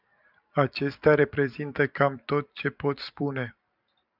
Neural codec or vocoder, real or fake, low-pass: vocoder, 44.1 kHz, 128 mel bands every 256 samples, BigVGAN v2; fake; 5.4 kHz